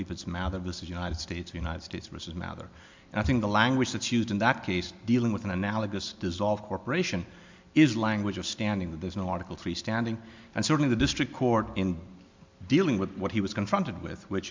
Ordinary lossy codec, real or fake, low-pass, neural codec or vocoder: MP3, 64 kbps; real; 7.2 kHz; none